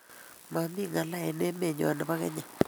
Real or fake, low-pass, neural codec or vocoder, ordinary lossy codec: real; none; none; none